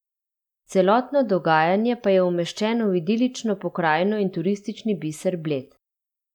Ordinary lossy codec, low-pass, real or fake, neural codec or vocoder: none; 19.8 kHz; real; none